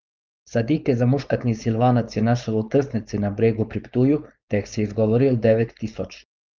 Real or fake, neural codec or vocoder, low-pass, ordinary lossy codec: fake; codec, 16 kHz, 4.8 kbps, FACodec; 7.2 kHz; Opus, 24 kbps